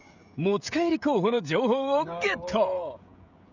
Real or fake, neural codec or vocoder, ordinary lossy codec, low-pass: fake; codec, 16 kHz, 16 kbps, FreqCodec, smaller model; none; 7.2 kHz